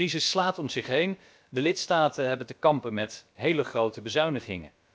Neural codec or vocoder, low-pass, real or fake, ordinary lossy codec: codec, 16 kHz, about 1 kbps, DyCAST, with the encoder's durations; none; fake; none